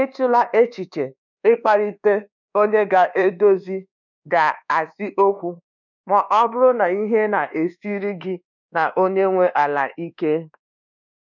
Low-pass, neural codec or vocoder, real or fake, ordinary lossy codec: 7.2 kHz; codec, 24 kHz, 1.2 kbps, DualCodec; fake; none